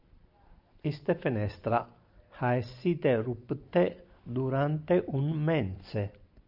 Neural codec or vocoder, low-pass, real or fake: none; 5.4 kHz; real